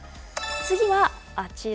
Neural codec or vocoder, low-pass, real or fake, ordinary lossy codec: none; none; real; none